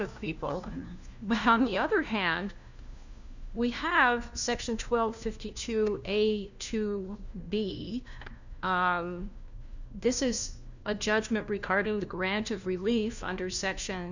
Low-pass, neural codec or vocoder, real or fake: 7.2 kHz; codec, 16 kHz, 1 kbps, FunCodec, trained on LibriTTS, 50 frames a second; fake